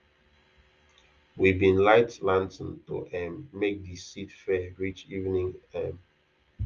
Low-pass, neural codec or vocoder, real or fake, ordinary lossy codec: 7.2 kHz; none; real; Opus, 32 kbps